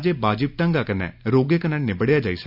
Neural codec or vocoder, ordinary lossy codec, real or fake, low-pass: none; none; real; 5.4 kHz